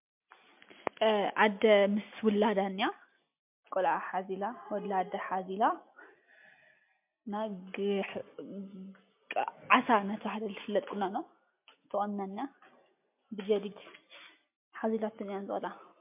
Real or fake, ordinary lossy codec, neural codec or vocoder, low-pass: real; MP3, 32 kbps; none; 3.6 kHz